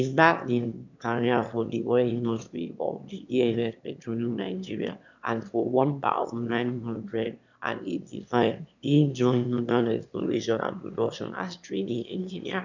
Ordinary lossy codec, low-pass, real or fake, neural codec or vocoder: none; 7.2 kHz; fake; autoencoder, 22.05 kHz, a latent of 192 numbers a frame, VITS, trained on one speaker